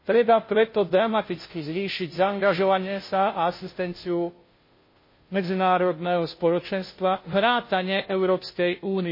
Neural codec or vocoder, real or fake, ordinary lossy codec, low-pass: codec, 16 kHz, 0.5 kbps, FunCodec, trained on Chinese and English, 25 frames a second; fake; MP3, 24 kbps; 5.4 kHz